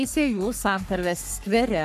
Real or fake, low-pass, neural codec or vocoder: fake; 14.4 kHz; codec, 44.1 kHz, 3.4 kbps, Pupu-Codec